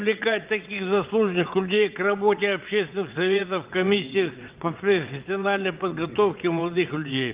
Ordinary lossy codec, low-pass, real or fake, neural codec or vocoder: Opus, 32 kbps; 3.6 kHz; fake; vocoder, 22.05 kHz, 80 mel bands, WaveNeXt